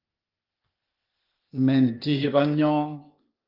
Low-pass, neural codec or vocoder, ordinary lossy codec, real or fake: 5.4 kHz; codec, 16 kHz, 0.8 kbps, ZipCodec; Opus, 32 kbps; fake